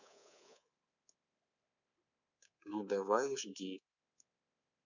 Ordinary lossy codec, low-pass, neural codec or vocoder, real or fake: none; 7.2 kHz; codec, 24 kHz, 3.1 kbps, DualCodec; fake